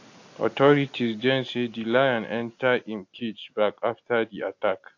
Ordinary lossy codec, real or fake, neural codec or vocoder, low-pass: AAC, 48 kbps; real; none; 7.2 kHz